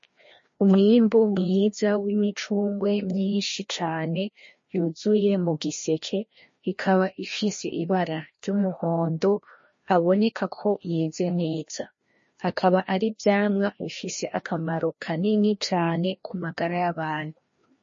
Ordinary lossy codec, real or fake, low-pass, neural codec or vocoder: MP3, 32 kbps; fake; 7.2 kHz; codec, 16 kHz, 1 kbps, FreqCodec, larger model